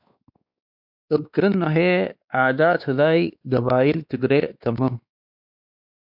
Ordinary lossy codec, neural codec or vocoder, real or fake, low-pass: AAC, 48 kbps; codec, 16 kHz, 2 kbps, X-Codec, WavLM features, trained on Multilingual LibriSpeech; fake; 5.4 kHz